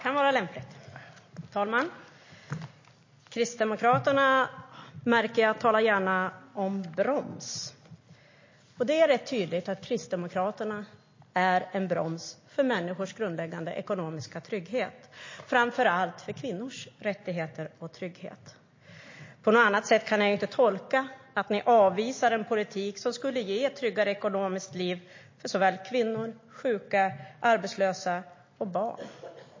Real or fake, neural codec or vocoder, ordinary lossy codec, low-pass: real; none; MP3, 32 kbps; 7.2 kHz